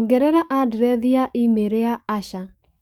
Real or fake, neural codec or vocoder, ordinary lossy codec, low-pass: fake; codec, 44.1 kHz, 7.8 kbps, DAC; none; 19.8 kHz